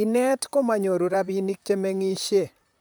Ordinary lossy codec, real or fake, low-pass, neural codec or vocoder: none; fake; none; vocoder, 44.1 kHz, 128 mel bands, Pupu-Vocoder